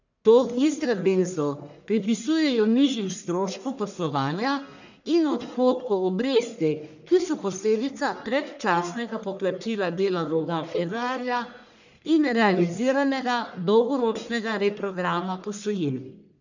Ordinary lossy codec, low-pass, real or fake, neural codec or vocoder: none; 7.2 kHz; fake; codec, 44.1 kHz, 1.7 kbps, Pupu-Codec